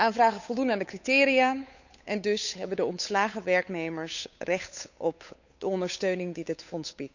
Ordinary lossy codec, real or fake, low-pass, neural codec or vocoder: none; fake; 7.2 kHz; codec, 16 kHz, 8 kbps, FunCodec, trained on Chinese and English, 25 frames a second